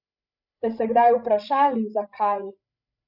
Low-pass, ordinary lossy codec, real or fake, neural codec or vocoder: 5.4 kHz; none; fake; codec, 16 kHz, 16 kbps, FreqCodec, larger model